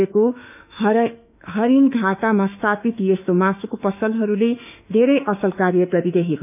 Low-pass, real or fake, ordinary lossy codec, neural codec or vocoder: 3.6 kHz; fake; none; autoencoder, 48 kHz, 32 numbers a frame, DAC-VAE, trained on Japanese speech